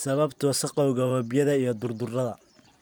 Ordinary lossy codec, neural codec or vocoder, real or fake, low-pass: none; vocoder, 44.1 kHz, 128 mel bands every 512 samples, BigVGAN v2; fake; none